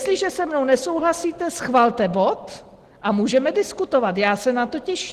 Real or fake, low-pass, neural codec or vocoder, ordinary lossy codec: real; 14.4 kHz; none; Opus, 16 kbps